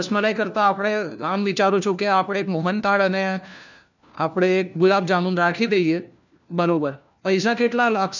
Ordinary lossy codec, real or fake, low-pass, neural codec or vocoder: none; fake; 7.2 kHz; codec, 16 kHz, 1 kbps, FunCodec, trained on LibriTTS, 50 frames a second